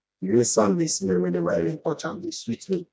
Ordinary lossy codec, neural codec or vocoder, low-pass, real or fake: none; codec, 16 kHz, 1 kbps, FreqCodec, smaller model; none; fake